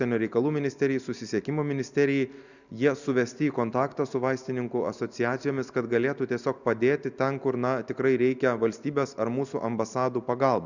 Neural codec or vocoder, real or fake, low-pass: none; real; 7.2 kHz